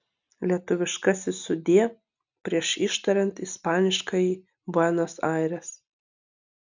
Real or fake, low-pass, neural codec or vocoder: real; 7.2 kHz; none